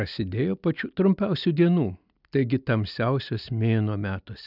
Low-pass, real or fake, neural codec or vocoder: 5.4 kHz; real; none